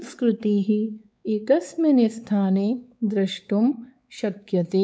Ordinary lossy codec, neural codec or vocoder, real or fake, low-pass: none; codec, 16 kHz, 4 kbps, X-Codec, WavLM features, trained on Multilingual LibriSpeech; fake; none